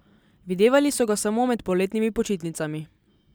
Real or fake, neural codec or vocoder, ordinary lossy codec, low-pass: real; none; none; none